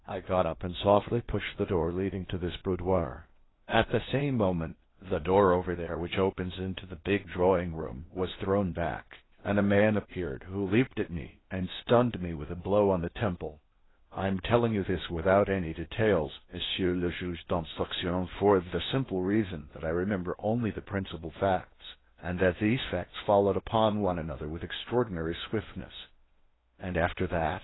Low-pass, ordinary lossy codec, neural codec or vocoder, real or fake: 7.2 kHz; AAC, 16 kbps; codec, 16 kHz in and 24 kHz out, 0.8 kbps, FocalCodec, streaming, 65536 codes; fake